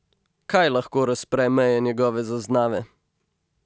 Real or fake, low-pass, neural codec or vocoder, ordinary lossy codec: real; none; none; none